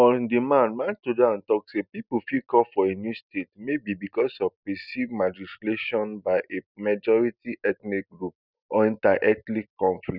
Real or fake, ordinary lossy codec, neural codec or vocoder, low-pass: real; none; none; 5.4 kHz